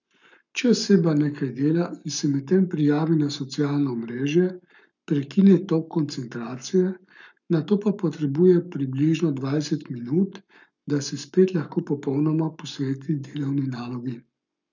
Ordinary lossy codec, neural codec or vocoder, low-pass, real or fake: none; codec, 44.1 kHz, 7.8 kbps, Pupu-Codec; 7.2 kHz; fake